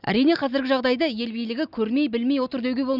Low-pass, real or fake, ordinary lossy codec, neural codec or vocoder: 5.4 kHz; real; none; none